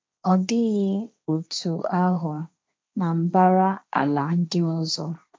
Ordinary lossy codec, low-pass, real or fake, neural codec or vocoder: none; none; fake; codec, 16 kHz, 1.1 kbps, Voila-Tokenizer